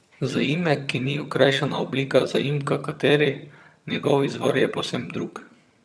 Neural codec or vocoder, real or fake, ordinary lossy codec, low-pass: vocoder, 22.05 kHz, 80 mel bands, HiFi-GAN; fake; none; none